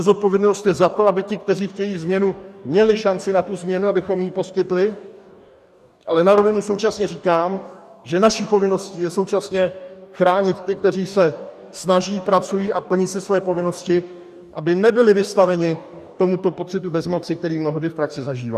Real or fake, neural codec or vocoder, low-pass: fake; codec, 44.1 kHz, 2.6 kbps, DAC; 14.4 kHz